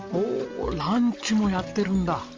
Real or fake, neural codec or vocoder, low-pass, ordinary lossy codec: real; none; 7.2 kHz; Opus, 32 kbps